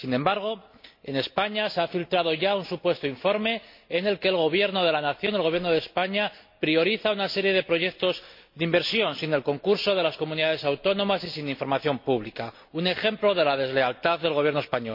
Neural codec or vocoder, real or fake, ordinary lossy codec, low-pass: none; real; MP3, 32 kbps; 5.4 kHz